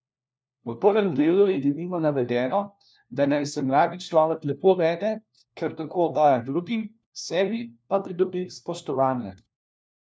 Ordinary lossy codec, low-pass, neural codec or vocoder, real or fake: none; none; codec, 16 kHz, 1 kbps, FunCodec, trained on LibriTTS, 50 frames a second; fake